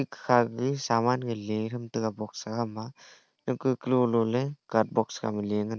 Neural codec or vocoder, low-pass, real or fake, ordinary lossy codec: none; none; real; none